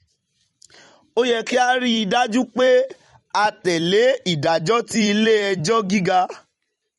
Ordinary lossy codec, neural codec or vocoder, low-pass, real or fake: MP3, 48 kbps; vocoder, 44.1 kHz, 128 mel bands every 256 samples, BigVGAN v2; 19.8 kHz; fake